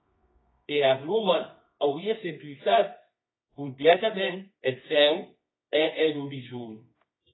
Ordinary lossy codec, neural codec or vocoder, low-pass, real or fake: AAC, 16 kbps; codec, 24 kHz, 0.9 kbps, WavTokenizer, medium music audio release; 7.2 kHz; fake